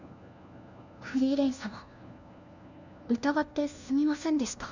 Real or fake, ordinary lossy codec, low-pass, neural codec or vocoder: fake; none; 7.2 kHz; codec, 16 kHz, 1 kbps, FunCodec, trained on LibriTTS, 50 frames a second